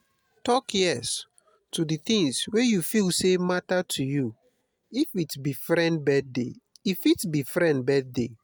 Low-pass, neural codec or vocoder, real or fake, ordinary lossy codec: none; none; real; none